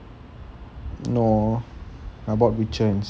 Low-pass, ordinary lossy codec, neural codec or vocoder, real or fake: none; none; none; real